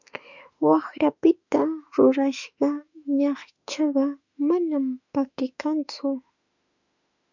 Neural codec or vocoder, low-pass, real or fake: autoencoder, 48 kHz, 32 numbers a frame, DAC-VAE, trained on Japanese speech; 7.2 kHz; fake